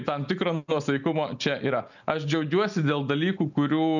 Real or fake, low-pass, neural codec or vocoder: real; 7.2 kHz; none